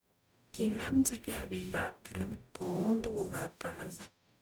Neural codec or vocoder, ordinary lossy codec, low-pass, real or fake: codec, 44.1 kHz, 0.9 kbps, DAC; none; none; fake